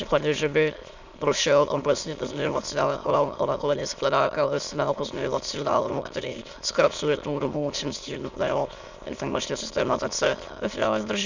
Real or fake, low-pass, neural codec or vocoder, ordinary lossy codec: fake; 7.2 kHz; autoencoder, 22.05 kHz, a latent of 192 numbers a frame, VITS, trained on many speakers; Opus, 64 kbps